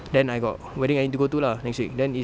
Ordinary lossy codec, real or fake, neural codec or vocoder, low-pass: none; real; none; none